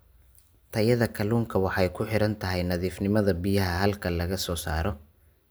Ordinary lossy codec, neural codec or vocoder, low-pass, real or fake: none; none; none; real